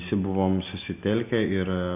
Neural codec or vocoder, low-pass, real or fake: none; 3.6 kHz; real